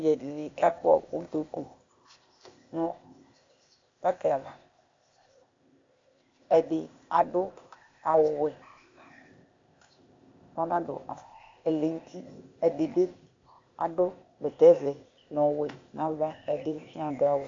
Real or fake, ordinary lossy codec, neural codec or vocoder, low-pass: fake; AAC, 48 kbps; codec, 16 kHz, 0.8 kbps, ZipCodec; 7.2 kHz